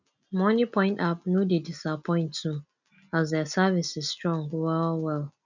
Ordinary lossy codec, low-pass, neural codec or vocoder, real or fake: none; 7.2 kHz; none; real